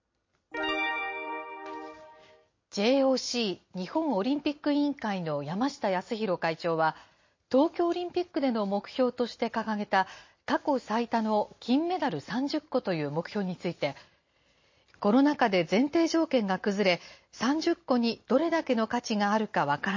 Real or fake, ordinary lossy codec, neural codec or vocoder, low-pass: real; MP3, 32 kbps; none; 7.2 kHz